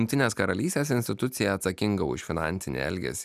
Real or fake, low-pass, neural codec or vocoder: real; 14.4 kHz; none